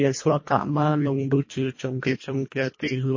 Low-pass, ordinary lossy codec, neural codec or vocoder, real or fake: 7.2 kHz; MP3, 32 kbps; codec, 24 kHz, 1.5 kbps, HILCodec; fake